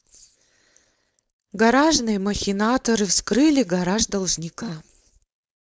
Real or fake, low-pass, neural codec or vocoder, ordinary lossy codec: fake; none; codec, 16 kHz, 4.8 kbps, FACodec; none